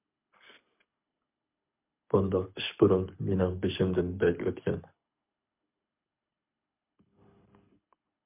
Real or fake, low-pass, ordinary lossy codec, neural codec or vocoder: fake; 3.6 kHz; MP3, 32 kbps; codec, 24 kHz, 6 kbps, HILCodec